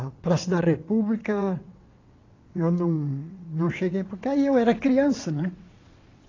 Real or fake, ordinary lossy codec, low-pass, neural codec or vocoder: fake; AAC, 32 kbps; 7.2 kHz; vocoder, 22.05 kHz, 80 mel bands, WaveNeXt